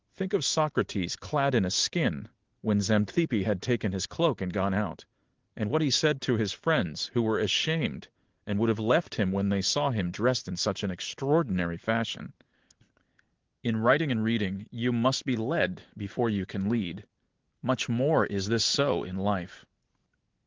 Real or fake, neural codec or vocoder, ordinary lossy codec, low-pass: real; none; Opus, 16 kbps; 7.2 kHz